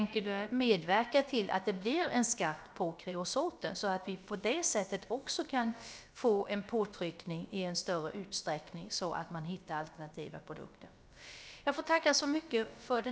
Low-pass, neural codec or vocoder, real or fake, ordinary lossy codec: none; codec, 16 kHz, about 1 kbps, DyCAST, with the encoder's durations; fake; none